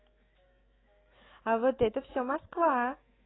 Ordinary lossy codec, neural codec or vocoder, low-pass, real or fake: AAC, 16 kbps; autoencoder, 48 kHz, 128 numbers a frame, DAC-VAE, trained on Japanese speech; 7.2 kHz; fake